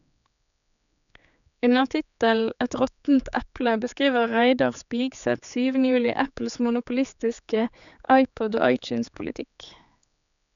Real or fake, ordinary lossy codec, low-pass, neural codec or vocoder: fake; none; 7.2 kHz; codec, 16 kHz, 4 kbps, X-Codec, HuBERT features, trained on general audio